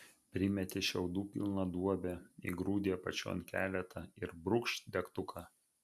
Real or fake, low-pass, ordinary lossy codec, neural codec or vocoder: real; 14.4 kHz; MP3, 96 kbps; none